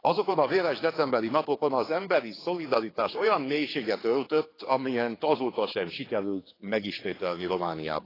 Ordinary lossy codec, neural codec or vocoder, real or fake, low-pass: AAC, 24 kbps; codec, 16 kHz, 4 kbps, X-Codec, HuBERT features, trained on general audio; fake; 5.4 kHz